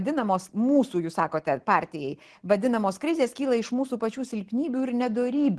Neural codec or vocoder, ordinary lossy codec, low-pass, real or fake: none; Opus, 16 kbps; 10.8 kHz; real